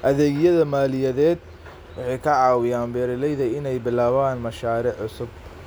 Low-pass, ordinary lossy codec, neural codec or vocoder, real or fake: none; none; none; real